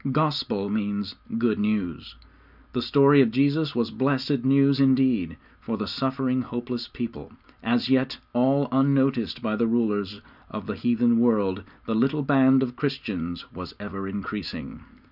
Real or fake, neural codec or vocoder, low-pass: real; none; 5.4 kHz